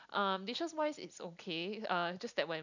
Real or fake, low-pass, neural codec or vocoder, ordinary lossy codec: real; 7.2 kHz; none; none